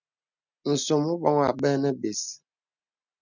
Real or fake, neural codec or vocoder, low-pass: real; none; 7.2 kHz